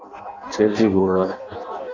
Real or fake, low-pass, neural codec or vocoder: fake; 7.2 kHz; codec, 16 kHz in and 24 kHz out, 0.6 kbps, FireRedTTS-2 codec